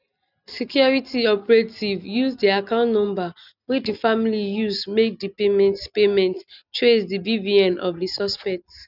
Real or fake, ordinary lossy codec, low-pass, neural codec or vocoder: real; none; 5.4 kHz; none